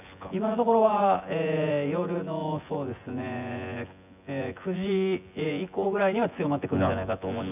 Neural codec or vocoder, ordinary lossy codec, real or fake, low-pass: vocoder, 24 kHz, 100 mel bands, Vocos; none; fake; 3.6 kHz